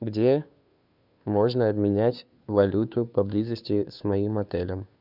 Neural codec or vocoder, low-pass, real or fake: codec, 16 kHz, 2 kbps, FunCodec, trained on Chinese and English, 25 frames a second; 5.4 kHz; fake